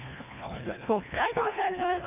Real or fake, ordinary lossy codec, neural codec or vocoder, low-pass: fake; none; codec, 24 kHz, 1.5 kbps, HILCodec; 3.6 kHz